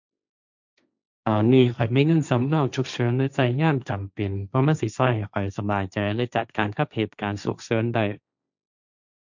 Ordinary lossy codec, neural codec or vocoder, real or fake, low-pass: none; codec, 16 kHz, 1.1 kbps, Voila-Tokenizer; fake; 7.2 kHz